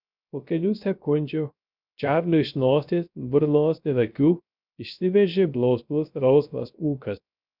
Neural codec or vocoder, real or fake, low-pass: codec, 16 kHz, 0.3 kbps, FocalCodec; fake; 5.4 kHz